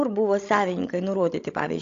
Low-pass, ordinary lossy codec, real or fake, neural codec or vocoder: 7.2 kHz; AAC, 48 kbps; fake; codec, 16 kHz, 16 kbps, FreqCodec, larger model